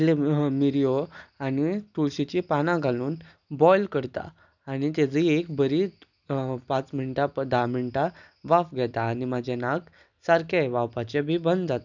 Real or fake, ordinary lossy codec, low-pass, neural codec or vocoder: real; none; 7.2 kHz; none